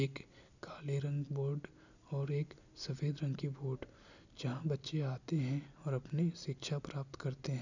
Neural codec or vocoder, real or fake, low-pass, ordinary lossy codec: none; real; 7.2 kHz; none